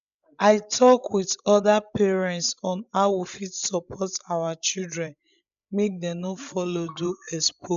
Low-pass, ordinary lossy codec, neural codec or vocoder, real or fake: 7.2 kHz; MP3, 96 kbps; codec, 16 kHz, 16 kbps, FreqCodec, larger model; fake